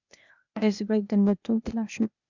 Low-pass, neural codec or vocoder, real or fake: 7.2 kHz; codec, 16 kHz, 0.8 kbps, ZipCodec; fake